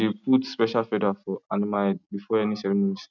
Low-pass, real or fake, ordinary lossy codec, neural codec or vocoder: 7.2 kHz; real; none; none